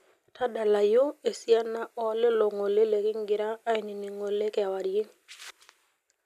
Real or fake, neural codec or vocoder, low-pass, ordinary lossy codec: real; none; 14.4 kHz; none